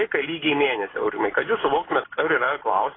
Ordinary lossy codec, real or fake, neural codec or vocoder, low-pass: AAC, 16 kbps; real; none; 7.2 kHz